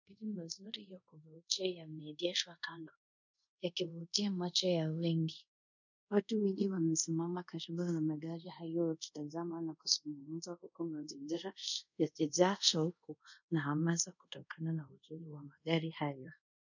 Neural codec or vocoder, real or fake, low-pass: codec, 24 kHz, 0.5 kbps, DualCodec; fake; 7.2 kHz